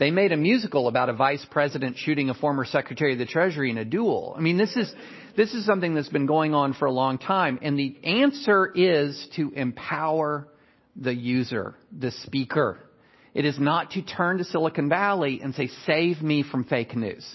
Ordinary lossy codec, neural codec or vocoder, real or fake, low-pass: MP3, 24 kbps; none; real; 7.2 kHz